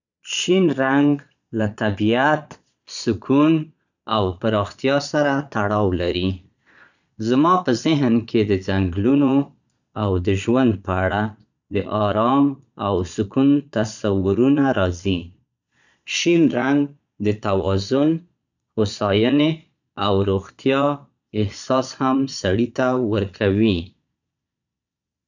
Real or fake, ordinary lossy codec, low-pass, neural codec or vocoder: fake; none; 7.2 kHz; vocoder, 22.05 kHz, 80 mel bands, Vocos